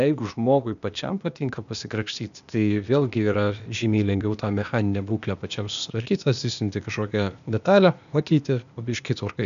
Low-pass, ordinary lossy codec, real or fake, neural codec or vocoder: 7.2 kHz; MP3, 96 kbps; fake; codec, 16 kHz, 0.8 kbps, ZipCodec